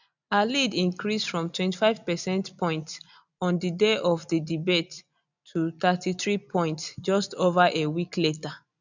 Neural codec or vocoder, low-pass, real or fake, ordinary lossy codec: none; 7.2 kHz; real; none